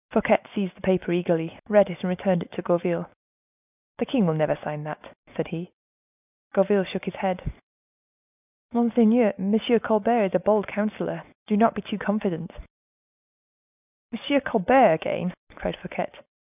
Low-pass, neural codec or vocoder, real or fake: 3.6 kHz; none; real